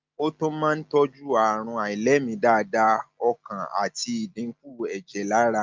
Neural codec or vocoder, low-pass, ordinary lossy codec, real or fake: none; 7.2 kHz; Opus, 24 kbps; real